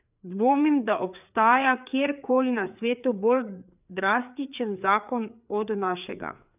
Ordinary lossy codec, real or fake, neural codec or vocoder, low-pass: none; fake; codec, 16 kHz, 4 kbps, FreqCodec, larger model; 3.6 kHz